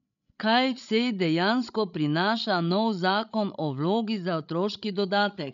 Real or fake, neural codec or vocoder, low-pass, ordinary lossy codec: fake; codec, 16 kHz, 16 kbps, FreqCodec, larger model; 7.2 kHz; none